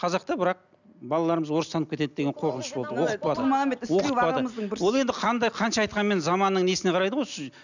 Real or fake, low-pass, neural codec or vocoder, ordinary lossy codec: real; 7.2 kHz; none; none